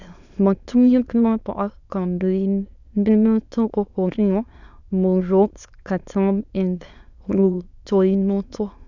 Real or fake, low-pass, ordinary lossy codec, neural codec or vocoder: fake; 7.2 kHz; none; autoencoder, 22.05 kHz, a latent of 192 numbers a frame, VITS, trained on many speakers